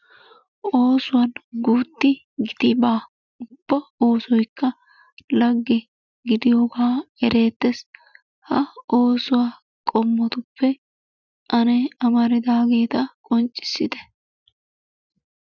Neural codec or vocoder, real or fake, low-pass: none; real; 7.2 kHz